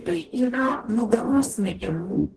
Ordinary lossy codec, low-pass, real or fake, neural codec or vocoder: Opus, 16 kbps; 10.8 kHz; fake; codec, 44.1 kHz, 0.9 kbps, DAC